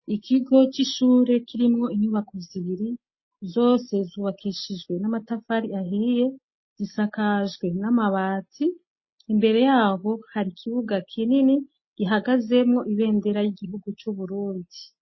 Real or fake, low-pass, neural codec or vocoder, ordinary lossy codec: real; 7.2 kHz; none; MP3, 24 kbps